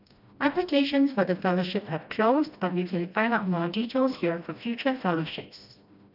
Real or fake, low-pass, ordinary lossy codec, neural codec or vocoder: fake; 5.4 kHz; none; codec, 16 kHz, 1 kbps, FreqCodec, smaller model